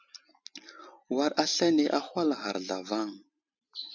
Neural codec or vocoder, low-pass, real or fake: none; 7.2 kHz; real